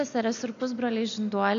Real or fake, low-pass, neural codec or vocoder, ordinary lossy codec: real; 7.2 kHz; none; MP3, 64 kbps